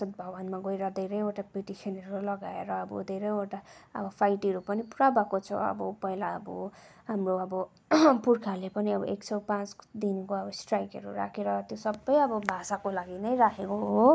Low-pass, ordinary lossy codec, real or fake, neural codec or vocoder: none; none; real; none